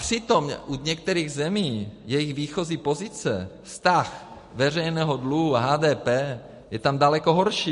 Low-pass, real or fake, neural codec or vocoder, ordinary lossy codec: 10.8 kHz; real; none; MP3, 48 kbps